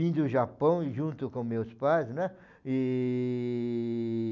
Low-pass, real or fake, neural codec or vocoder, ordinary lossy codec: 7.2 kHz; real; none; none